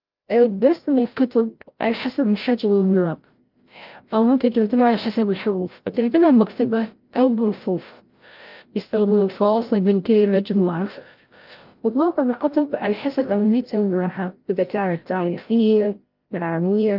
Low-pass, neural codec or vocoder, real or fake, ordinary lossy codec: 5.4 kHz; codec, 16 kHz, 0.5 kbps, FreqCodec, larger model; fake; Opus, 32 kbps